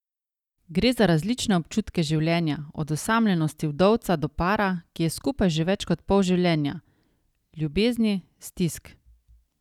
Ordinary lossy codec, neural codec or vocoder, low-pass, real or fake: none; none; 19.8 kHz; real